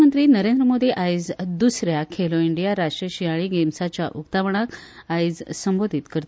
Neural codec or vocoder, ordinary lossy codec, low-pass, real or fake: none; none; none; real